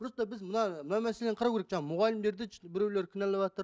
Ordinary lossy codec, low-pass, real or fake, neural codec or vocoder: none; none; real; none